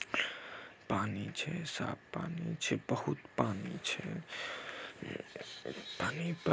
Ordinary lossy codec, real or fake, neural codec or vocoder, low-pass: none; real; none; none